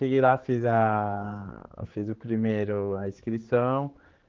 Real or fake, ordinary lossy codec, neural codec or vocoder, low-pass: fake; Opus, 16 kbps; codec, 16 kHz, 4 kbps, X-Codec, HuBERT features, trained on general audio; 7.2 kHz